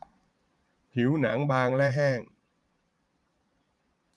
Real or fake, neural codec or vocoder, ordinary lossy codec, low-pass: fake; vocoder, 22.05 kHz, 80 mel bands, Vocos; none; none